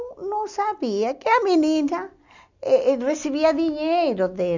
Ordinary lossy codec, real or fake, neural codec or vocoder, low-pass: none; real; none; 7.2 kHz